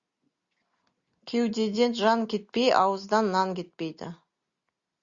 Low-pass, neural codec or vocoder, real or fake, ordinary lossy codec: 7.2 kHz; none; real; Opus, 64 kbps